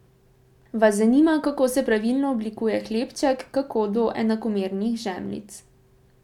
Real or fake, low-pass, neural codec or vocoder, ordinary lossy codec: real; 19.8 kHz; none; none